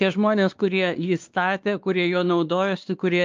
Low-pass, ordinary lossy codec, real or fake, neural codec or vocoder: 7.2 kHz; Opus, 24 kbps; fake; codec, 16 kHz, 2 kbps, X-Codec, WavLM features, trained on Multilingual LibriSpeech